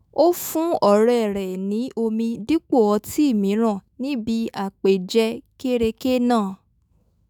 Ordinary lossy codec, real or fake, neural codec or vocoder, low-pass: none; fake; autoencoder, 48 kHz, 128 numbers a frame, DAC-VAE, trained on Japanese speech; none